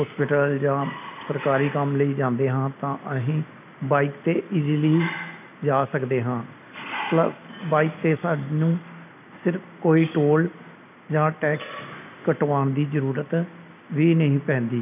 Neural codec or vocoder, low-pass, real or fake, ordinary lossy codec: none; 3.6 kHz; real; none